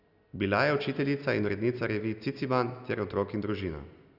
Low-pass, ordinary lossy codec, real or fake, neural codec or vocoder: 5.4 kHz; Opus, 64 kbps; real; none